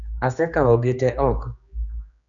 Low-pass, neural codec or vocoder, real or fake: 7.2 kHz; codec, 16 kHz, 2 kbps, X-Codec, HuBERT features, trained on general audio; fake